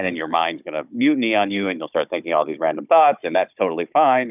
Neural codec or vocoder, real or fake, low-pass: codec, 16 kHz, 16 kbps, FunCodec, trained on Chinese and English, 50 frames a second; fake; 3.6 kHz